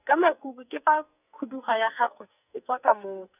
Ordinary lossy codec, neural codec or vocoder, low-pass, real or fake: none; codec, 44.1 kHz, 2.6 kbps, SNAC; 3.6 kHz; fake